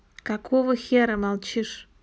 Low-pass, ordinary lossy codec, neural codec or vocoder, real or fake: none; none; none; real